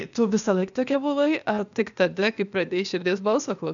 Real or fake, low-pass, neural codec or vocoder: fake; 7.2 kHz; codec, 16 kHz, 0.8 kbps, ZipCodec